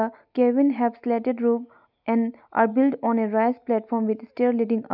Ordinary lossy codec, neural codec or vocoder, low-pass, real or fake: none; none; 5.4 kHz; real